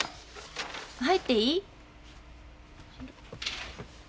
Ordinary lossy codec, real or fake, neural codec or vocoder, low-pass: none; real; none; none